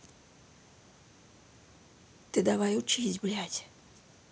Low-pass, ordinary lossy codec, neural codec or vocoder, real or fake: none; none; none; real